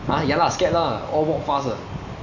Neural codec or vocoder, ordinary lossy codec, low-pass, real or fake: none; none; 7.2 kHz; real